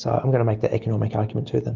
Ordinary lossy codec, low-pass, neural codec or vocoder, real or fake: Opus, 32 kbps; 7.2 kHz; none; real